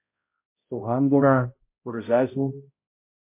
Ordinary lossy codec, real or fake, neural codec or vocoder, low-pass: MP3, 24 kbps; fake; codec, 16 kHz, 0.5 kbps, X-Codec, HuBERT features, trained on balanced general audio; 3.6 kHz